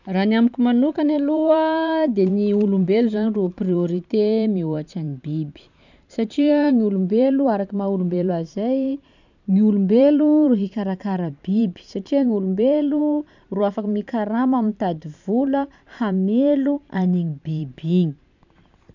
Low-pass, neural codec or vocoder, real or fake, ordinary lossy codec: 7.2 kHz; vocoder, 44.1 kHz, 128 mel bands every 512 samples, BigVGAN v2; fake; none